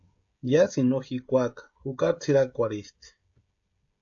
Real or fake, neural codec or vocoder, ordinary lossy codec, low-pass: fake; codec, 16 kHz, 16 kbps, FreqCodec, smaller model; AAC, 48 kbps; 7.2 kHz